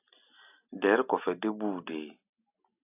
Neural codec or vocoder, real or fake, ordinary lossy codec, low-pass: none; real; AAC, 32 kbps; 3.6 kHz